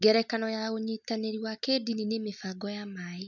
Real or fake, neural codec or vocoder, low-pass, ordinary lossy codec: real; none; 7.2 kHz; none